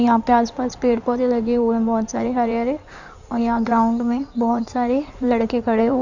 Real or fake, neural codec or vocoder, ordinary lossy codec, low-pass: fake; codec, 16 kHz in and 24 kHz out, 2.2 kbps, FireRedTTS-2 codec; none; 7.2 kHz